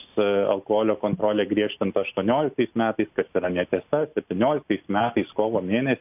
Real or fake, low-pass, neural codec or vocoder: real; 3.6 kHz; none